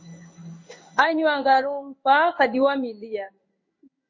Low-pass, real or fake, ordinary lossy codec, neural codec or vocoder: 7.2 kHz; fake; MP3, 32 kbps; codec, 16 kHz in and 24 kHz out, 1 kbps, XY-Tokenizer